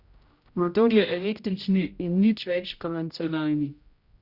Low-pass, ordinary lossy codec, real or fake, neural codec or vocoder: 5.4 kHz; Opus, 64 kbps; fake; codec, 16 kHz, 0.5 kbps, X-Codec, HuBERT features, trained on general audio